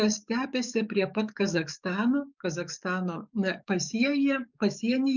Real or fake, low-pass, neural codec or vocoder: fake; 7.2 kHz; codec, 44.1 kHz, 7.8 kbps, DAC